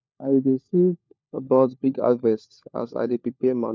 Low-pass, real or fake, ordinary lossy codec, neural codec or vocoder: none; fake; none; codec, 16 kHz, 4 kbps, FunCodec, trained on LibriTTS, 50 frames a second